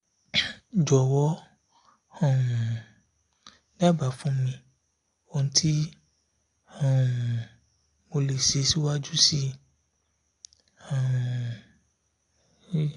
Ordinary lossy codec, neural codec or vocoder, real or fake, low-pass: AAC, 48 kbps; none; real; 10.8 kHz